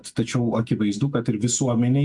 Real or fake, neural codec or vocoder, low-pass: real; none; 10.8 kHz